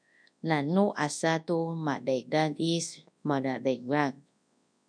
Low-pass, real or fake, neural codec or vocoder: 9.9 kHz; fake; codec, 24 kHz, 0.9 kbps, WavTokenizer, large speech release